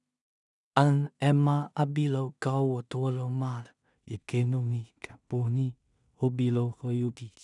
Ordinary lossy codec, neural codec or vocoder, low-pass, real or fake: none; codec, 16 kHz in and 24 kHz out, 0.4 kbps, LongCat-Audio-Codec, two codebook decoder; 10.8 kHz; fake